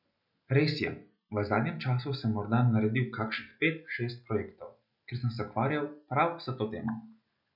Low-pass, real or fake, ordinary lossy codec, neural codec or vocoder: 5.4 kHz; real; none; none